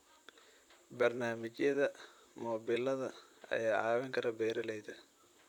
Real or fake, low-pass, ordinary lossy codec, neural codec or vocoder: fake; 19.8 kHz; none; vocoder, 44.1 kHz, 128 mel bands, Pupu-Vocoder